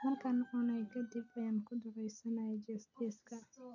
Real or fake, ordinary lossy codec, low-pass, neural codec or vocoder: real; none; 7.2 kHz; none